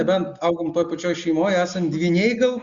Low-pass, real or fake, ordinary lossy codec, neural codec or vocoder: 7.2 kHz; real; Opus, 64 kbps; none